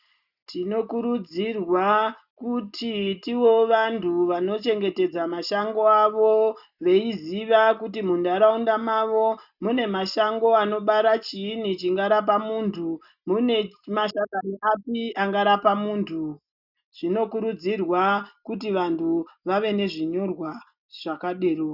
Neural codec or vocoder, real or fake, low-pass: none; real; 5.4 kHz